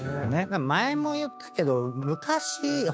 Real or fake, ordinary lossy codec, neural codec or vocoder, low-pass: fake; none; codec, 16 kHz, 6 kbps, DAC; none